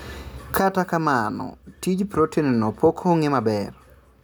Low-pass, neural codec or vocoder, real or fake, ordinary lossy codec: none; none; real; none